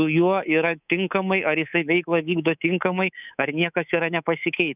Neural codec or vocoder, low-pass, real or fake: codec, 24 kHz, 3.1 kbps, DualCodec; 3.6 kHz; fake